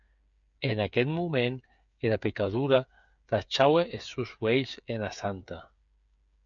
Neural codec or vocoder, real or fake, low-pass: codec, 16 kHz, 8 kbps, FreqCodec, smaller model; fake; 7.2 kHz